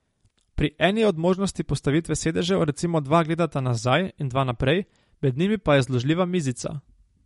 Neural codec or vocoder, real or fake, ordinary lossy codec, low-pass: none; real; MP3, 48 kbps; 19.8 kHz